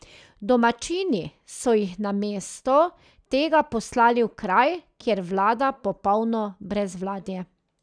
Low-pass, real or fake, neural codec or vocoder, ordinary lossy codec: 9.9 kHz; real; none; none